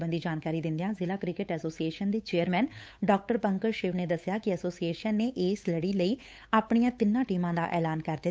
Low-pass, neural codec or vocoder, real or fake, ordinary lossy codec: none; codec, 16 kHz, 8 kbps, FunCodec, trained on Chinese and English, 25 frames a second; fake; none